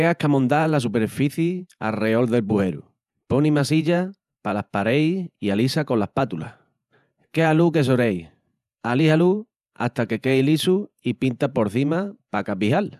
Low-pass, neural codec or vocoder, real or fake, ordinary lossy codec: 14.4 kHz; vocoder, 48 kHz, 128 mel bands, Vocos; fake; none